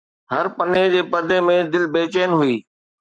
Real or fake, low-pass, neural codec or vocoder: fake; 9.9 kHz; codec, 44.1 kHz, 7.8 kbps, Pupu-Codec